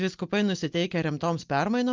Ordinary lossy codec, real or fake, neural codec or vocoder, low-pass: Opus, 32 kbps; real; none; 7.2 kHz